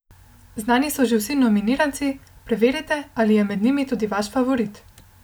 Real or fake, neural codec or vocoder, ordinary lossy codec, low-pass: real; none; none; none